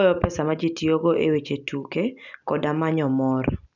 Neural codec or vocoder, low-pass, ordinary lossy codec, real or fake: none; 7.2 kHz; none; real